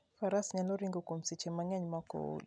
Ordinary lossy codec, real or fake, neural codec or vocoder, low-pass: none; real; none; 9.9 kHz